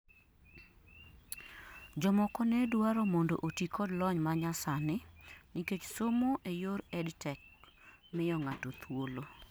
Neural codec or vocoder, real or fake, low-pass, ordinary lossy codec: none; real; none; none